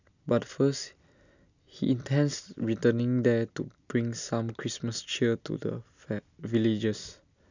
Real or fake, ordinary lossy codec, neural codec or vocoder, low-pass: real; none; none; 7.2 kHz